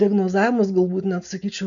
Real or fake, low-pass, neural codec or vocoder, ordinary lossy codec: real; 7.2 kHz; none; MP3, 96 kbps